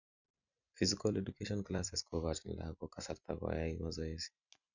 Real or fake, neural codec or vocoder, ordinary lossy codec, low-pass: fake; vocoder, 44.1 kHz, 128 mel bands every 256 samples, BigVGAN v2; none; 7.2 kHz